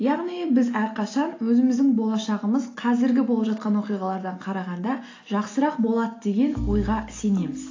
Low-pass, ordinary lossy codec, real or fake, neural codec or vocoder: 7.2 kHz; AAC, 32 kbps; real; none